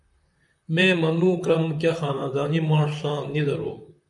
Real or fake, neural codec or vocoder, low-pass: fake; vocoder, 44.1 kHz, 128 mel bands, Pupu-Vocoder; 10.8 kHz